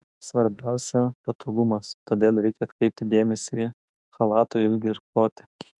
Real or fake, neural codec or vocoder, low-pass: fake; autoencoder, 48 kHz, 32 numbers a frame, DAC-VAE, trained on Japanese speech; 10.8 kHz